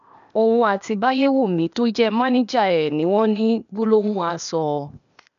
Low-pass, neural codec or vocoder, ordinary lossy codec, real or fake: 7.2 kHz; codec, 16 kHz, 0.8 kbps, ZipCodec; MP3, 96 kbps; fake